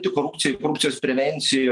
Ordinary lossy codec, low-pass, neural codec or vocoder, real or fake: Opus, 32 kbps; 10.8 kHz; none; real